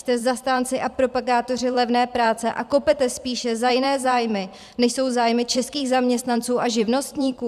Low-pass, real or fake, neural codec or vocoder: 14.4 kHz; fake; vocoder, 44.1 kHz, 128 mel bands every 512 samples, BigVGAN v2